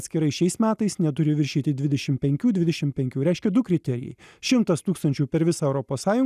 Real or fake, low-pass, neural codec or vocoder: real; 14.4 kHz; none